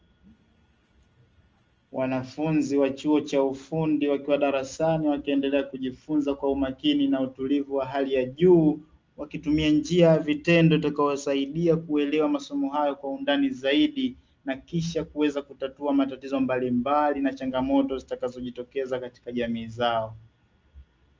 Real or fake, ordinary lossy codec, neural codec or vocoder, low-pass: real; Opus, 24 kbps; none; 7.2 kHz